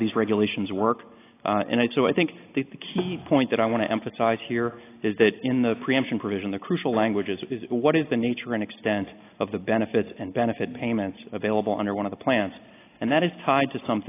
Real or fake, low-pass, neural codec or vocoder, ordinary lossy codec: real; 3.6 kHz; none; AAC, 24 kbps